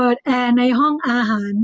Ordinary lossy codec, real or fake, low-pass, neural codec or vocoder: none; real; none; none